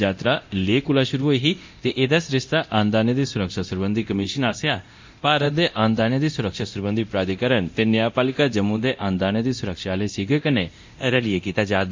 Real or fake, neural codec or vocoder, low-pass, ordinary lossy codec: fake; codec, 24 kHz, 0.9 kbps, DualCodec; 7.2 kHz; MP3, 64 kbps